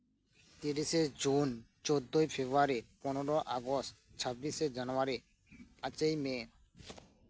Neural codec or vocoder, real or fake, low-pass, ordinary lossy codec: none; real; none; none